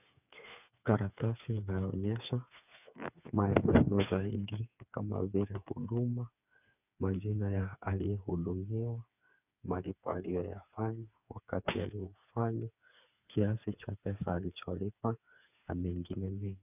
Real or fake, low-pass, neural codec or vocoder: fake; 3.6 kHz; codec, 16 kHz, 4 kbps, FreqCodec, smaller model